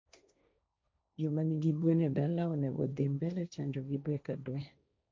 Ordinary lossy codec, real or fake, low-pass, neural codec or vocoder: none; fake; none; codec, 16 kHz, 1.1 kbps, Voila-Tokenizer